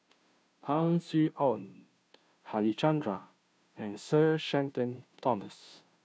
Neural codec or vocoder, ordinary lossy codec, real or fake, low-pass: codec, 16 kHz, 0.5 kbps, FunCodec, trained on Chinese and English, 25 frames a second; none; fake; none